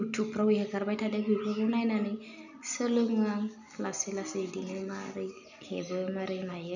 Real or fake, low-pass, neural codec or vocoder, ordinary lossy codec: real; 7.2 kHz; none; none